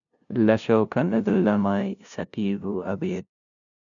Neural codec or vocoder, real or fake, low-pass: codec, 16 kHz, 0.5 kbps, FunCodec, trained on LibriTTS, 25 frames a second; fake; 7.2 kHz